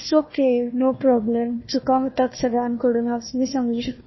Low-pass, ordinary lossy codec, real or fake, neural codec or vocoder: 7.2 kHz; MP3, 24 kbps; fake; codec, 16 kHz, 1 kbps, FunCodec, trained on Chinese and English, 50 frames a second